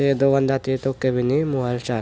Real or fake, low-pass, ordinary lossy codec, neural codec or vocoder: real; none; none; none